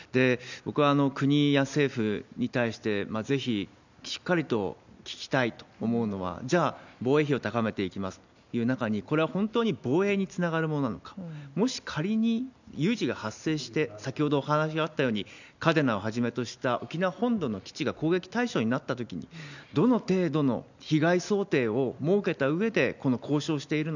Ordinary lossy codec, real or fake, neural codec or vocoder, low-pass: none; real; none; 7.2 kHz